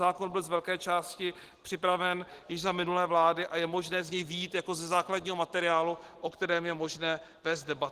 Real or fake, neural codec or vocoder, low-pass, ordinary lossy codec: real; none; 14.4 kHz; Opus, 16 kbps